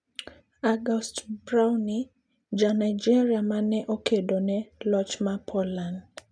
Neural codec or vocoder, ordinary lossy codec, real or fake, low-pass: none; none; real; none